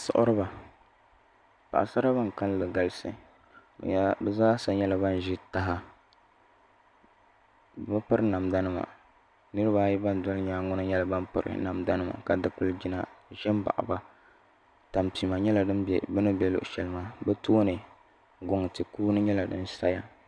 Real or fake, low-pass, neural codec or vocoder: real; 9.9 kHz; none